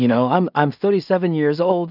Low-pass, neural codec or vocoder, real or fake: 5.4 kHz; codec, 16 kHz in and 24 kHz out, 0.4 kbps, LongCat-Audio-Codec, two codebook decoder; fake